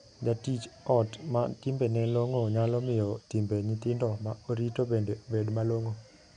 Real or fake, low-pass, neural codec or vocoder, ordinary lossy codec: real; 9.9 kHz; none; none